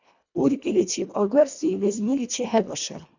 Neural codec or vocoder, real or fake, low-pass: codec, 24 kHz, 1.5 kbps, HILCodec; fake; 7.2 kHz